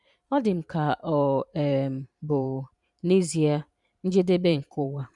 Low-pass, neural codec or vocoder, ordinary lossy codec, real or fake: 10.8 kHz; vocoder, 44.1 kHz, 128 mel bands every 512 samples, BigVGAN v2; none; fake